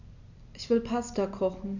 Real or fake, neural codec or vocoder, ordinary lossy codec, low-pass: real; none; none; 7.2 kHz